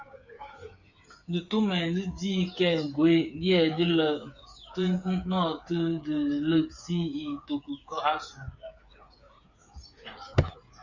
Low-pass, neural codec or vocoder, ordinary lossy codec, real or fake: 7.2 kHz; codec, 16 kHz, 8 kbps, FreqCodec, smaller model; Opus, 64 kbps; fake